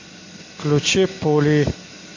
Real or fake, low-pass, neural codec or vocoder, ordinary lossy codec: real; 7.2 kHz; none; MP3, 48 kbps